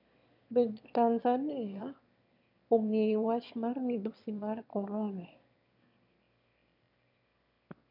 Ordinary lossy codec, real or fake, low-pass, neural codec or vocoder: none; fake; 5.4 kHz; autoencoder, 22.05 kHz, a latent of 192 numbers a frame, VITS, trained on one speaker